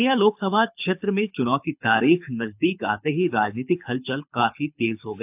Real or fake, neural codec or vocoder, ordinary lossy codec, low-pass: fake; codec, 24 kHz, 6 kbps, HILCodec; AAC, 32 kbps; 3.6 kHz